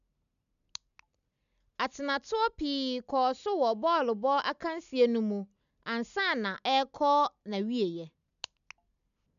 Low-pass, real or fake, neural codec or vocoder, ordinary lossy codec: 7.2 kHz; real; none; none